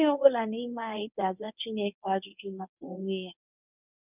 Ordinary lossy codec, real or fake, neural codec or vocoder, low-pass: none; fake; codec, 24 kHz, 0.9 kbps, WavTokenizer, medium speech release version 1; 3.6 kHz